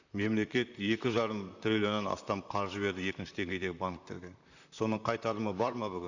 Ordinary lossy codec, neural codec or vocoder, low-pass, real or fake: none; vocoder, 44.1 kHz, 128 mel bands, Pupu-Vocoder; 7.2 kHz; fake